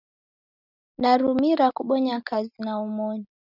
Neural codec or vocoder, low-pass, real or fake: none; 5.4 kHz; real